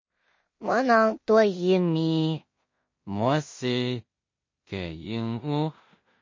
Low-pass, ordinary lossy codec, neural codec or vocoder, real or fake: 7.2 kHz; MP3, 32 kbps; codec, 16 kHz in and 24 kHz out, 0.4 kbps, LongCat-Audio-Codec, two codebook decoder; fake